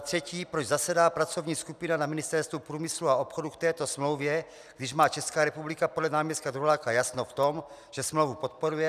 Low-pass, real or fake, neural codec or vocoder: 14.4 kHz; real; none